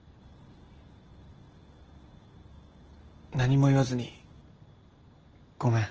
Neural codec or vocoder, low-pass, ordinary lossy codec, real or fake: none; 7.2 kHz; Opus, 16 kbps; real